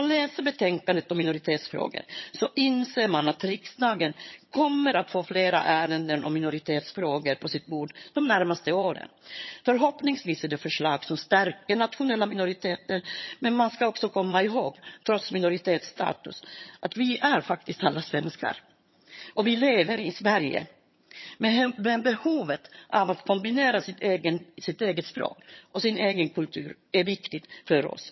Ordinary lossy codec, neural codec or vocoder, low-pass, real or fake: MP3, 24 kbps; vocoder, 22.05 kHz, 80 mel bands, HiFi-GAN; 7.2 kHz; fake